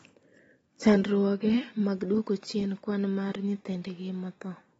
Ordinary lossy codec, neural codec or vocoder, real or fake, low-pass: AAC, 24 kbps; none; real; 19.8 kHz